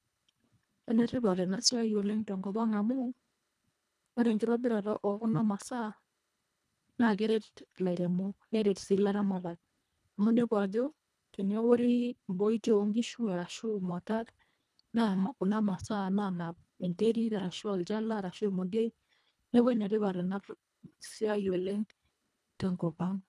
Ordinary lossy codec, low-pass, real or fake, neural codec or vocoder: none; none; fake; codec, 24 kHz, 1.5 kbps, HILCodec